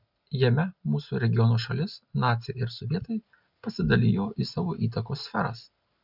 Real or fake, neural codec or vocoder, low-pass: real; none; 5.4 kHz